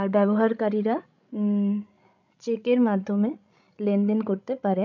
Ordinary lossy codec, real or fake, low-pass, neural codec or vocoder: none; fake; 7.2 kHz; codec, 16 kHz, 16 kbps, FreqCodec, larger model